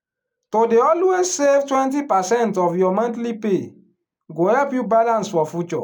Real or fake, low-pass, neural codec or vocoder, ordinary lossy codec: real; none; none; none